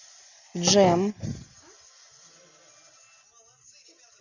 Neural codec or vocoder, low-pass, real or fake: none; 7.2 kHz; real